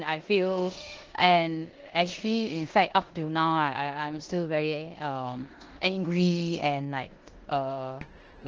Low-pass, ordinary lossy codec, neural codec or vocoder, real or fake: 7.2 kHz; Opus, 16 kbps; codec, 16 kHz in and 24 kHz out, 0.9 kbps, LongCat-Audio-Codec, four codebook decoder; fake